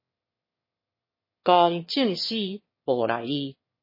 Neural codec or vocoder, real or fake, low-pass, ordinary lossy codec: autoencoder, 22.05 kHz, a latent of 192 numbers a frame, VITS, trained on one speaker; fake; 5.4 kHz; MP3, 24 kbps